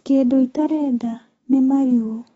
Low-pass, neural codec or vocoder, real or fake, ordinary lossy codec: 19.8 kHz; codec, 44.1 kHz, 7.8 kbps, DAC; fake; AAC, 24 kbps